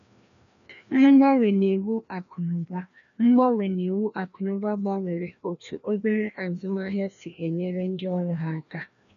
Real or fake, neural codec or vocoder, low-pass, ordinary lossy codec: fake; codec, 16 kHz, 1 kbps, FreqCodec, larger model; 7.2 kHz; none